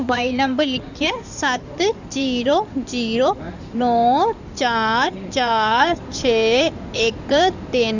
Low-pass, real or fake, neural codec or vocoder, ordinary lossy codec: 7.2 kHz; fake; codec, 16 kHz in and 24 kHz out, 2.2 kbps, FireRedTTS-2 codec; none